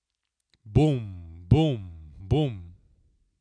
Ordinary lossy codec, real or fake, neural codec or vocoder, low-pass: none; real; none; 9.9 kHz